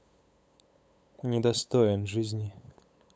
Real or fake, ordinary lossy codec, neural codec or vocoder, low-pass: fake; none; codec, 16 kHz, 8 kbps, FunCodec, trained on LibriTTS, 25 frames a second; none